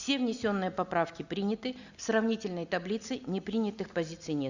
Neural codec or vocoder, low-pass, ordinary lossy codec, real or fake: none; 7.2 kHz; Opus, 64 kbps; real